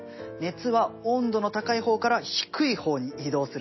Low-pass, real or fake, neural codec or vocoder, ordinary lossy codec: 7.2 kHz; real; none; MP3, 24 kbps